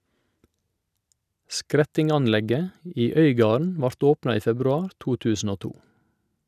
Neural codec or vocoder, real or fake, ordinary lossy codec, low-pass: none; real; none; 14.4 kHz